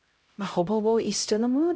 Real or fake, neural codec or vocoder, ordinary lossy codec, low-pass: fake; codec, 16 kHz, 0.5 kbps, X-Codec, HuBERT features, trained on LibriSpeech; none; none